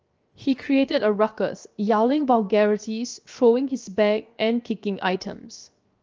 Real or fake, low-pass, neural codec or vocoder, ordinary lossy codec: fake; 7.2 kHz; codec, 16 kHz, 0.7 kbps, FocalCodec; Opus, 24 kbps